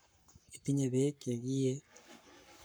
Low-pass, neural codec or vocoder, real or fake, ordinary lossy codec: none; codec, 44.1 kHz, 7.8 kbps, Pupu-Codec; fake; none